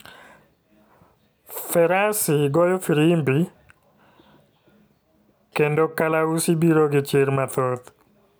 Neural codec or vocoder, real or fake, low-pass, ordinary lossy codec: none; real; none; none